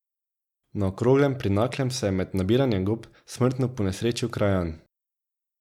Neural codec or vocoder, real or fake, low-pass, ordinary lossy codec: none; real; 19.8 kHz; none